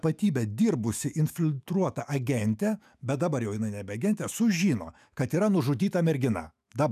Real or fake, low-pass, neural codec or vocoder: fake; 14.4 kHz; autoencoder, 48 kHz, 128 numbers a frame, DAC-VAE, trained on Japanese speech